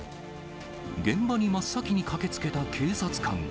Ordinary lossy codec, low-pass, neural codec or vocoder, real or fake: none; none; none; real